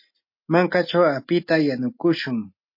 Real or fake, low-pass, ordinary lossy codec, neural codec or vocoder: real; 5.4 kHz; MP3, 32 kbps; none